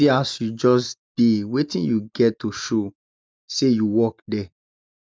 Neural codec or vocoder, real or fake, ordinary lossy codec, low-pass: none; real; none; none